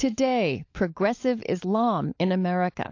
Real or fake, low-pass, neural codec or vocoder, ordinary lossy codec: fake; 7.2 kHz; codec, 16 kHz, 16 kbps, FunCodec, trained on LibriTTS, 50 frames a second; AAC, 48 kbps